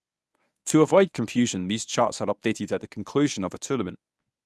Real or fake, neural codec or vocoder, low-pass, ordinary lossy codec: fake; codec, 24 kHz, 0.9 kbps, WavTokenizer, medium speech release version 1; none; none